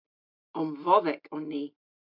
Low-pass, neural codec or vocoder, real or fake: 5.4 kHz; none; real